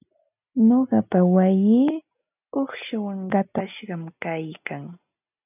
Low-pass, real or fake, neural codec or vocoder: 3.6 kHz; real; none